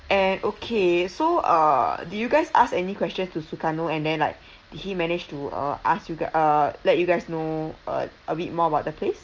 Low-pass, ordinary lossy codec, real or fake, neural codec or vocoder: 7.2 kHz; Opus, 24 kbps; real; none